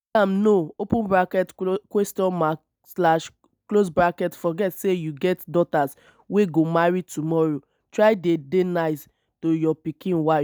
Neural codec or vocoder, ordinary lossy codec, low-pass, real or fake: none; none; none; real